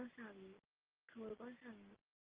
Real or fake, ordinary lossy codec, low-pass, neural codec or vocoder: real; Opus, 32 kbps; 3.6 kHz; none